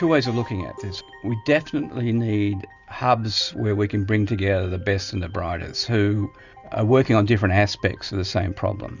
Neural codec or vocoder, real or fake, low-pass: none; real; 7.2 kHz